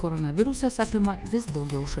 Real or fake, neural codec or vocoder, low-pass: fake; codec, 24 kHz, 1.2 kbps, DualCodec; 10.8 kHz